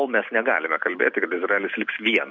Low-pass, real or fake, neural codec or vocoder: 7.2 kHz; real; none